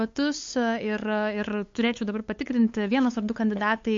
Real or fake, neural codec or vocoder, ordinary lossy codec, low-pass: fake; codec, 16 kHz, 8 kbps, FunCodec, trained on LibriTTS, 25 frames a second; MP3, 48 kbps; 7.2 kHz